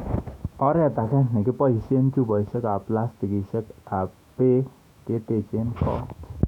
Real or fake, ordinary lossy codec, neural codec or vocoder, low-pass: fake; none; autoencoder, 48 kHz, 128 numbers a frame, DAC-VAE, trained on Japanese speech; 19.8 kHz